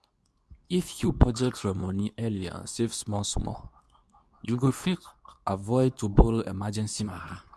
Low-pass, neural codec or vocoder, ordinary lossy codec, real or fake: none; codec, 24 kHz, 0.9 kbps, WavTokenizer, medium speech release version 2; none; fake